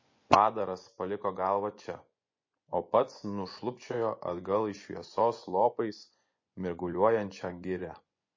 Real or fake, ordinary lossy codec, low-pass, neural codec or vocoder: fake; MP3, 32 kbps; 7.2 kHz; vocoder, 24 kHz, 100 mel bands, Vocos